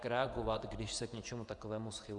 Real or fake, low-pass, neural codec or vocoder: fake; 10.8 kHz; vocoder, 24 kHz, 100 mel bands, Vocos